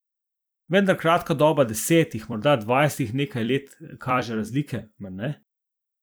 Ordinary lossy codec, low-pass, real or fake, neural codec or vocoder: none; none; fake; vocoder, 44.1 kHz, 128 mel bands every 256 samples, BigVGAN v2